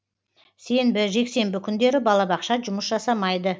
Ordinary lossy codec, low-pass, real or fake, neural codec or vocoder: none; none; real; none